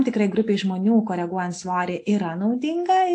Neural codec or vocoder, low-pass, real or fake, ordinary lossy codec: none; 9.9 kHz; real; AAC, 48 kbps